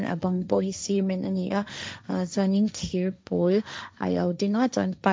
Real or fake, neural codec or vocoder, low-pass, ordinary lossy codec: fake; codec, 16 kHz, 1.1 kbps, Voila-Tokenizer; none; none